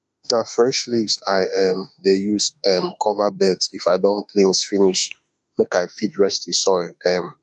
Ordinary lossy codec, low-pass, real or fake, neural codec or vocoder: none; 10.8 kHz; fake; autoencoder, 48 kHz, 32 numbers a frame, DAC-VAE, trained on Japanese speech